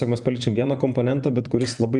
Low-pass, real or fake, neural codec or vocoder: 10.8 kHz; fake; vocoder, 24 kHz, 100 mel bands, Vocos